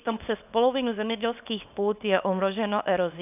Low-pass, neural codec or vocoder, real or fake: 3.6 kHz; codec, 24 kHz, 0.9 kbps, WavTokenizer, small release; fake